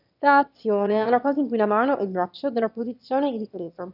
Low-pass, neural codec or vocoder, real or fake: 5.4 kHz; autoencoder, 22.05 kHz, a latent of 192 numbers a frame, VITS, trained on one speaker; fake